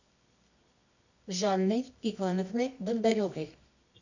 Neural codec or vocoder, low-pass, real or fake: codec, 24 kHz, 0.9 kbps, WavTokenizer, medium music audio release; 7.2 kHz; fake